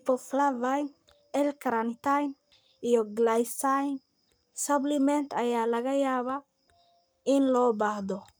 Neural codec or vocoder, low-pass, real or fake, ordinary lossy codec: codec, 44.1 kHz, 7.8 kbps, Pupu-Codec; none; fake; none